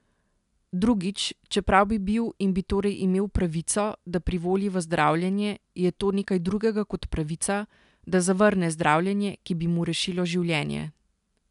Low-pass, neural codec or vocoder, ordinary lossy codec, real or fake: 10.8 kHz; none; none; real